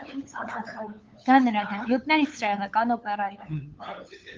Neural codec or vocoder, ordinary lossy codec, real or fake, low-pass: codec, 16 kHz, 8 kbps, FunCodec, trained on LibriTTS, 25 frames a second; Opus, 32 kbps; fake; 7.2 kHz